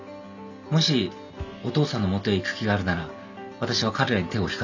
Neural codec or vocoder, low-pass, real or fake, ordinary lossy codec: none; 7.2 kHz; real; none